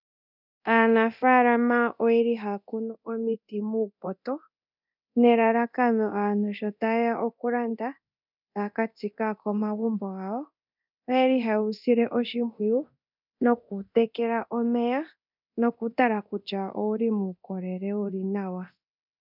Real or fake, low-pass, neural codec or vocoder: fake; 5.4 kHz; codec, 24 kHz, 0.9 kbps, DualCodec